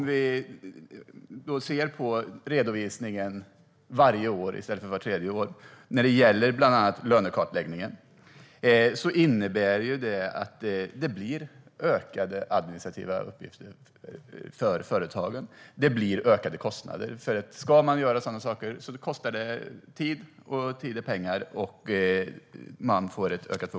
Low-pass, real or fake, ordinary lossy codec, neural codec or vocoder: none; real; none; none